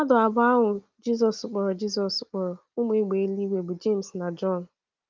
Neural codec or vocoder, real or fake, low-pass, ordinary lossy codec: none; real; 7.2 kHz; Opus, 24 kbps